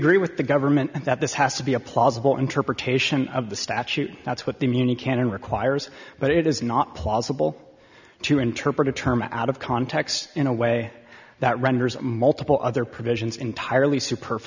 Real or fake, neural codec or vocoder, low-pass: real; none; 7.2 kHz